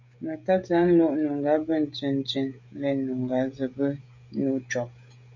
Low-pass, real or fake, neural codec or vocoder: 7.2 kHz; fake; codec, 16 kHz, 16 kbps, FreqCodec, smaller model